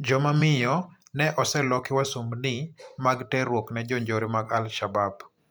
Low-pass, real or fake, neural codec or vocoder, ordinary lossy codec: none; fake; vocoder, 44.1 kHz, 128 mel bands every 512 samples, BigVGAN v2; none